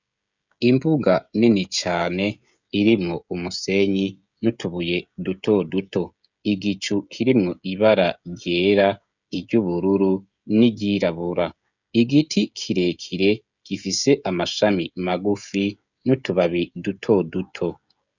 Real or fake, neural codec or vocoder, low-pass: fake; codec, 16 kHz, 16 kbps, FreqCodec, smaller model; 7.2 kHz